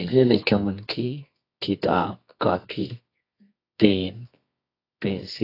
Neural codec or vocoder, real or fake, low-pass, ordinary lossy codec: codec, 24 kHz, 0.9 kbps, WavTokenizer, medium music audio release; fake; 5.4 kHz; AAC, 32 kbps